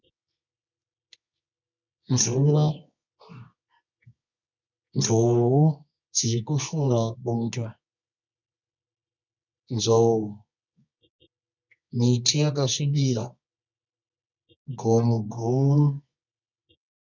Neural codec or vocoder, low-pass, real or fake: codec, 24 kHz, 0.9 kbps, WavTokenizer, medium music audio release; 7.2 kHz; fake